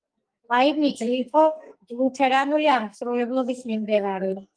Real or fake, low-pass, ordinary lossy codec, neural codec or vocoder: fake; 9.9 kHz; Opus, 32 kbps; codec, 44.1 kHz, 2.6 kbps, SNAC